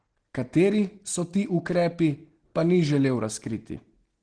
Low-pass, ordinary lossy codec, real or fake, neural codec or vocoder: 9.9 kHz; Opus, 16 kbps; real; none